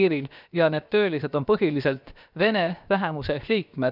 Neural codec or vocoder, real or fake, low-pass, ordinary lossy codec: codec, 16 kHz, about 1 kbps, DyCAST, with the encoder's durations; fake; 5.4 kHz; none